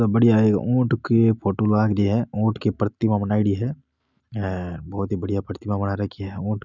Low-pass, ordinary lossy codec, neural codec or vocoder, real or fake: 7.2 kHz; none; none; real